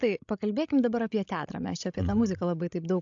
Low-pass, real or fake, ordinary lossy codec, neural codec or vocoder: 7.2 kHz; fake; AAC, 64 kbps; codec, 16 kHz, 16 kbps, FreqCodec, larger model